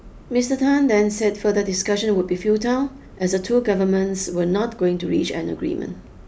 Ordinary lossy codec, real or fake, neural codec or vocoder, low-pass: none; real; none; none